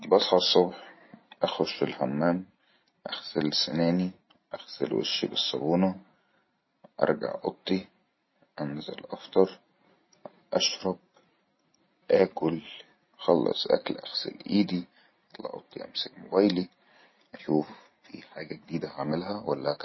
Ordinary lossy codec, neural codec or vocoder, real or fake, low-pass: MP3, 24 kbps; none; real; 7.2 kHz